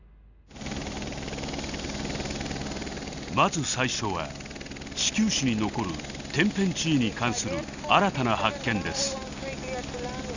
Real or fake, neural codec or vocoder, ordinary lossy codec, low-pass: real; none; none; 7.2 kHz